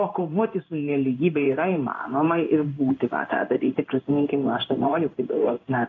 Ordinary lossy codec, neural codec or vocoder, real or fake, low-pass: MP3, 48 kbps; codec, 16 kHz in and 24 kHz out, 1 kbps, XY-Tokenizer; fake; 7.2 kHz